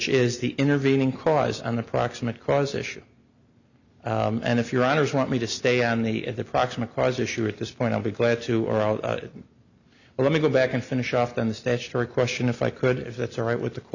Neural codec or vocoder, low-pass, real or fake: none; 7.2 kHz; real